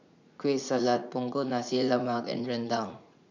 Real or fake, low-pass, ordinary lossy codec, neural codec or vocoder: fake; 7.2 kHz; none; vocoder, 44.1 kHz, 80 mel bands, Vocos